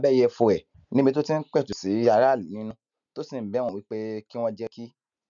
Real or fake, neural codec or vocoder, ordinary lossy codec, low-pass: real; none; none; 7.2 kHz